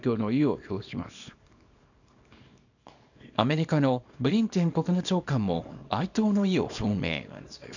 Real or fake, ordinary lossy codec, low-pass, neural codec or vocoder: fake; none; 7.2 kHz; codec, 24 kHz, 0.9 kbps, WavTokenizer, small release